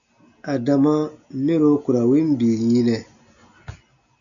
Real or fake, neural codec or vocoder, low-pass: real; none; 7.2 kHz